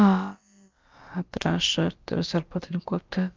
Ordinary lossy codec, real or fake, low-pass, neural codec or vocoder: Opus, 24 kbps; fake; 7.2 kHz; codec, 16 kHz, about 1 kbps, DyCAST, with the encoder's durations